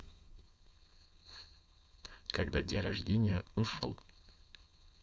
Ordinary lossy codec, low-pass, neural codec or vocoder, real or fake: none; none; codec, 16 kHz, 4.8 kbps, FACodec; fake